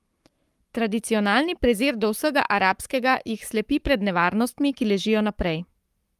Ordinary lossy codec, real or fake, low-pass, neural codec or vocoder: Opus, 32 kbps; fake; 14.4 kHz; codec, 44.1 kHz, 7.8 kbps, Pupu-Codec